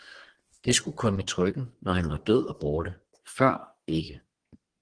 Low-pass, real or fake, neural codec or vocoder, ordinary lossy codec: 9.9 kHz; fake; codec, 24 kHz, 1 kbps, SNAC; Opus, 16 kbps